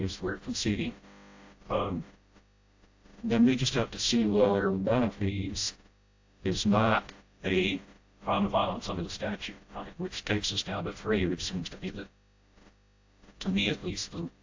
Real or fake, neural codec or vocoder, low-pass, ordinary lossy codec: fake; codec, 16 kHz, 0.5 kbps, FreqCodec, smaller model; 7.2 kHz; AAC, 48 kbps